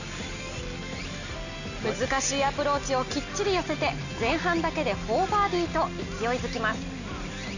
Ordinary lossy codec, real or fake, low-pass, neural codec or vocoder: none; real; 7.2 kHz; none